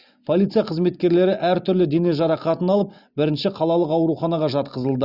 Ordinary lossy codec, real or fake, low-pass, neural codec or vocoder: Opus, 64 kbps; real; 5.4 kHz; none